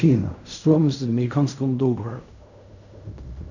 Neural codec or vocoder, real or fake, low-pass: codec, 16 kHz in and 24 kHz out, 0.4 kbps, LongCat-Audio-Codec, fine tuned four codebook decoder; fake; 7.2 kHz